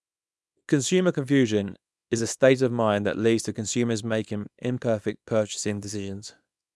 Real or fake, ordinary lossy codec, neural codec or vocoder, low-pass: fake; none; codec, 24 kHz, 0.9 kbps, WavTokenizer, small release; none